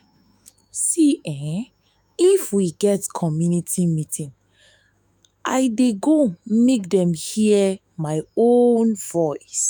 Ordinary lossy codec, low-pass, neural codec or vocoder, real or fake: none; none; autoencoder, 48 kHz, 128 numbers a frame, DAC-VAE, trained on Japanese speech; fake